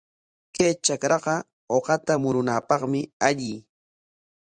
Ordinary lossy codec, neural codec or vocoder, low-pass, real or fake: Opus, 64 kbps; vocoder, 44.1 kHz, 128 mel bands every 512 samples, BigVGAN v2; 9.9 kHz; fake